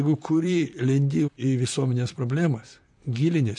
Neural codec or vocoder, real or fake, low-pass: vocoder, 44.1 kHz, 128 mel bands, Pupu-Vocoder; fake; 10.8 kHz